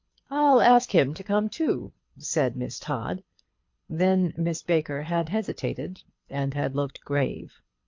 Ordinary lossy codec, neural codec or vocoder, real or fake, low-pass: MP3, 48 kbps; codec, 24 kHz, 6 kbps, HILCodec; fake; 7.2 kHz